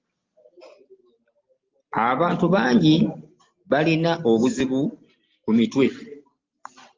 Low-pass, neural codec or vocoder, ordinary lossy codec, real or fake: 7.2 kHz; none; Opus, 24 kbps; real